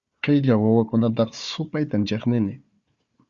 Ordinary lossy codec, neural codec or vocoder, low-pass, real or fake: Opus, 64 kbps; codec, 16 kHz, 4 kbps, FunCodec, trained on Chinese and English, 50 frames a second; 7.2 kHz; fake